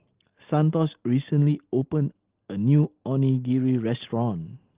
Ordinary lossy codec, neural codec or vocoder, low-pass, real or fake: Opus, 16 kbps; none; 3.6 kHz; real